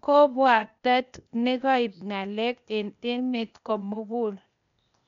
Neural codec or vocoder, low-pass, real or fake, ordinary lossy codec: codec, 16 kHz, 0.8 kbps, ZipCodec; 7.2 kHz; fake; none